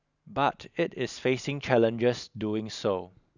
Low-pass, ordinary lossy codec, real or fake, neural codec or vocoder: 7.2 kHz; none; real; none